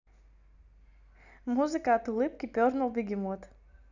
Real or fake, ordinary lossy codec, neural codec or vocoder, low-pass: real; none; none; 7.2 kHz